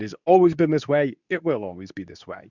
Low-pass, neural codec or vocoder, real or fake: 7.2 kHz; codec, 24 kHz, 0.9 kbps, WavTokenizer, medium speech release version 2; fake